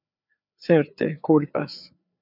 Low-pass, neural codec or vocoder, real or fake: 5.4 kHz; codec, 16 kHz, 4 kbps, FreqCodec, larger model; fake